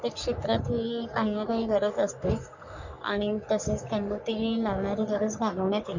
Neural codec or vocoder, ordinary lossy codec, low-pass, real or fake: codec, 44.1 kHz, 3.4 kbps, Pupu-Codec; none; 7.2 kHz; fake